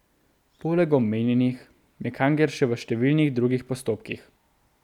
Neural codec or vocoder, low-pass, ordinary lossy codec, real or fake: none; 19.8 kHz; none; real